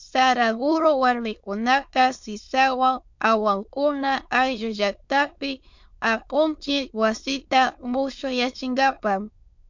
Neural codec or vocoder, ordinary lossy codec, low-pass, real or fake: autoencoder, 22.05 kHz, a latent of 192 numbers a frame, VITS, trained on many speakers; MP3, 48 kbps; 7.2 kHz; fake